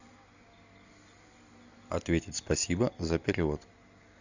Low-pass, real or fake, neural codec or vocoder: 7.2 kHz; real; none